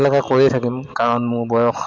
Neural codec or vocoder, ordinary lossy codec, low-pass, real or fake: none; MP3, 64 kbps; 7.2 kHz; real